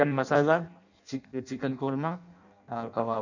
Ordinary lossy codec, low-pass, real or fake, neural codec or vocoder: none; 7.2 kHz; fake; codec, 16 kHz in and 24 kHz out, 0.6 kbps, FireRedTTS-2 codec